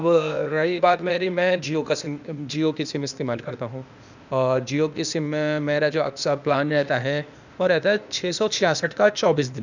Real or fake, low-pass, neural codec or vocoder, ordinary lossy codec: fake; 7.2 kHz; codec, 16 kHz, 0.8 kbps, ZipCodec; none